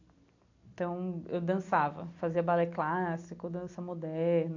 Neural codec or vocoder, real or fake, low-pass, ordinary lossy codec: none; real; 7.2 kHz; AAC, 48 kbps